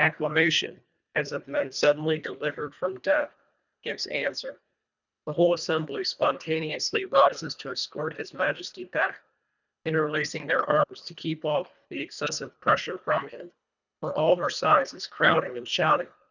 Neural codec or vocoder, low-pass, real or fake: codec, 24 kHz, 1.5 kbps, HILCodec; 7.2 kHz; fake